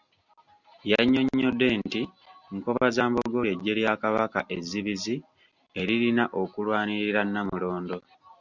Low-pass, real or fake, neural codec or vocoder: 7.2 kHz; real; none